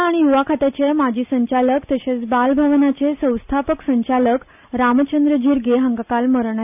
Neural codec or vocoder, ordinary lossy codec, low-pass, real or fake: none; none; 3.6 kHz; real